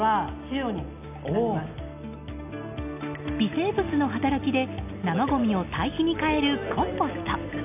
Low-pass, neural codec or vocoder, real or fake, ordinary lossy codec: 3.6 kHz; none; real; none